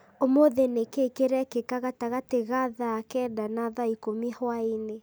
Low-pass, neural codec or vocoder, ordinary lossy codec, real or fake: none; none; none; real